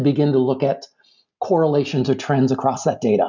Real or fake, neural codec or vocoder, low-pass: real; none; 7.2 kHz